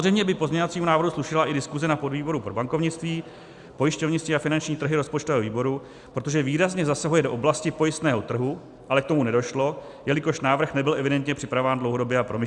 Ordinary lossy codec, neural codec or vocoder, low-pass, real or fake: Opus, 64 kbps; none; 10.8 kHz; real